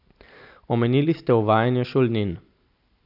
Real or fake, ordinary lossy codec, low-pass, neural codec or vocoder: real; none; 5.4 kHz; none